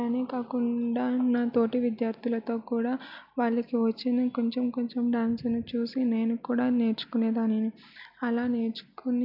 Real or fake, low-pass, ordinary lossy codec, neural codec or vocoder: real; 5.4 kHz; MP3, 48 kbps; none